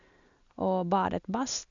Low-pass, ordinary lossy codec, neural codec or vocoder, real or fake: 7.2 kHz; none; none; real